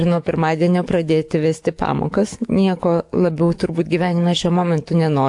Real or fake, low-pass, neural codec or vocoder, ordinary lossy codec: fake; 10.8 kHz; codec, 44.1 kHz, 7.8 kbps, DAC; AAC, 64 kbps